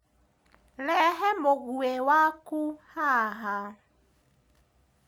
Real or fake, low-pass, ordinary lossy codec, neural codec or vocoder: real; none; none; none